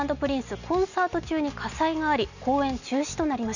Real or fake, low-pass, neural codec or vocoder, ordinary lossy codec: real; 7.2 kHz; none; none